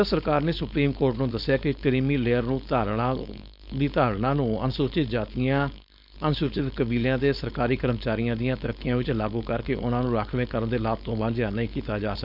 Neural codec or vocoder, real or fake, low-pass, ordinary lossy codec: codec, 16 kHz, 4.8 kbps, FACodec; fake; 5.4 kHz; none